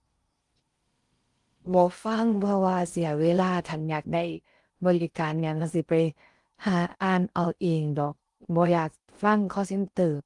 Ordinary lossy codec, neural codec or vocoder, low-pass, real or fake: Opus, 24 kbps; codec, 16 kHz in and 24 kHz out, 0.6 kbps, FocalCodec, streaming, 4096 codes; 10.8 kHz; fake